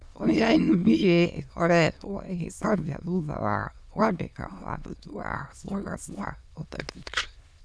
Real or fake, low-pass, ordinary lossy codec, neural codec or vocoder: fake; none; none; autoencoder, 22.05 kHz, a latent of 192 numbers a frame, VITS, trained on many speakers